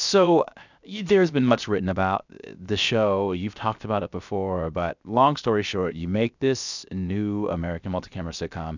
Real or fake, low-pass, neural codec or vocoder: fake; 7.2 kHz; codec, 16 kHz, 0.7 kbps, FocalCodec